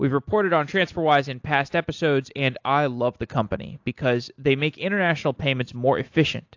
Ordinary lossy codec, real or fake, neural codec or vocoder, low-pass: AAC, 48 kbps; real; none; 7.2 kHz